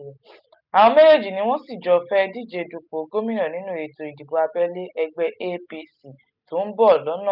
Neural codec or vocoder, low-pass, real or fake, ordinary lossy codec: none; 5.4 kHz; real; none